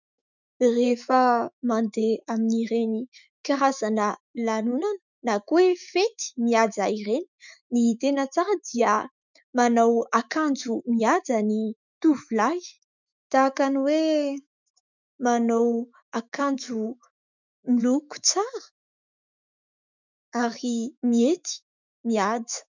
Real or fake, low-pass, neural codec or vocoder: fake; 7.2 kHz; autoencoder, 48 kHz, 128 numbers a frame, DAC-VAE, trained on Japanese speech